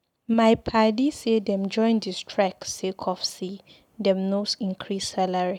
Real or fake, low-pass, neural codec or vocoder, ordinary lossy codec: real; 19.8 kHz; none; none